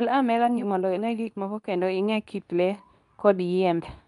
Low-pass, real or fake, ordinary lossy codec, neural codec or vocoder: 10.8 kHz; fake; none; codec, 24 kHz, 0.9 kbps, WavTokenizer, medium speech release version 2